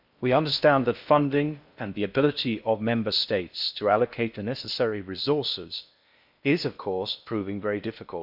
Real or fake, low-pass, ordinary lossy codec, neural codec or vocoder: fake; 5.4 kHz; none; codec, 16 kHz in and 24 kHz out, 0.6 kbps, FocalCodec, streaming, 4096 codes